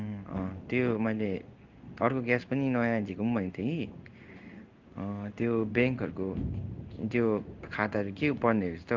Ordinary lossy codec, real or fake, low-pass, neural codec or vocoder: Opus, 24 kbps; fake; 7.2 kHz; codec, 16 kHz in and 24 kHz out, 1 kbps, XY-Tokenizer